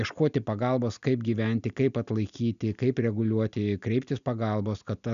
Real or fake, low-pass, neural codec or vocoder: real; 7.2 kHz; none